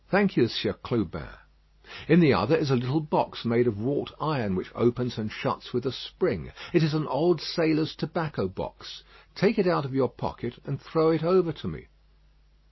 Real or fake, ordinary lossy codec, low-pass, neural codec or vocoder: real; MP3, 24 kbps; 7.2 kHz; none